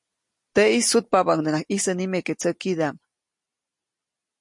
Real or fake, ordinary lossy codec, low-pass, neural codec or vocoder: real; MP3, 48 kbps; 10.8 kHz; none